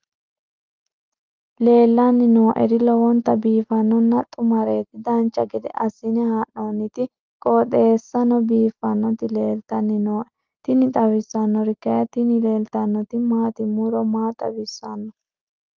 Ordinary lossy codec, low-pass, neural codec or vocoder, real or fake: Opus, 32 kbps; 7.2 kHz; none; real